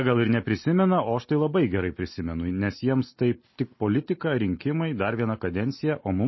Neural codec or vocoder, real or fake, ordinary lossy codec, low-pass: none; real; MP3, 24 kbps; 7.2 kHz